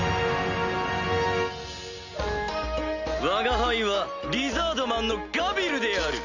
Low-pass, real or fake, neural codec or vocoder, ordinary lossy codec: 7.2 kHz; real; none; none